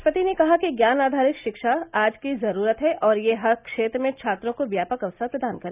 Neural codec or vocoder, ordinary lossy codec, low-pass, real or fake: none; none; 3.6 kHz; real